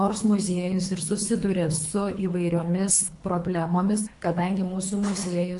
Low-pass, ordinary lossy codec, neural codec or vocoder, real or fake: 10.8 kHz; AAC, 64 kbps; codec, 24 kHz, 3 kbps, HILCodec; fake